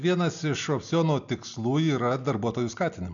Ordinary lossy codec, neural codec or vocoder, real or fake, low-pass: MP3, 96 kbps; none; real; 7.2 kHz